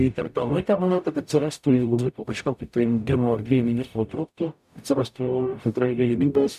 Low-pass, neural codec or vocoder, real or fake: 14.4 kHz; codec, 44.1 kHz, 0.9 kbps, DAC; fake